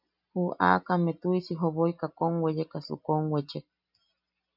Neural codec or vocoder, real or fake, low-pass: none; real; 5.4 kHz